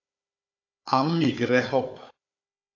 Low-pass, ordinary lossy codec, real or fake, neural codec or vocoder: 7.2 kHz; AAC, 48 kbps; fake; codec, 16 kHz, 4 kbps, FunCodec, trained on Chinese and English, 50 frames a second